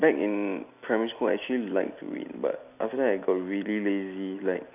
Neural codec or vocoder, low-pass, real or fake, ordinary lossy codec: none; 3.6 kHz; real; none